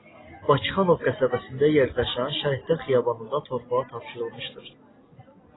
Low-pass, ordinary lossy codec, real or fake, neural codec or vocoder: 7.2 kHz; AAC, 16 kbps; real; none